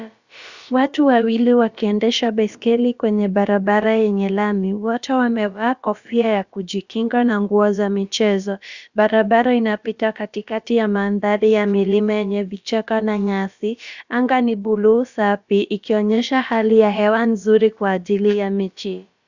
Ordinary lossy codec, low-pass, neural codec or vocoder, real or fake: Opus, 64 kbps; 7.2 kHz; codec, 16 kHz, about 1 kbps, DyCAST, with the encoder's durations; fake